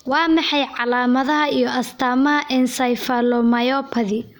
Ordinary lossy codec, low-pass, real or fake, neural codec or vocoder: none; none; real; none